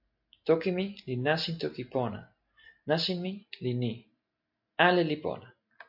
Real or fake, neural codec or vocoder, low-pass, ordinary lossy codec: real; none; 5.4 kHz; AAC, 48 kbps